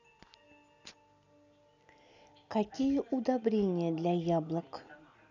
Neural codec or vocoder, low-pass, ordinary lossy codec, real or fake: none; 7.2 kHz; none; real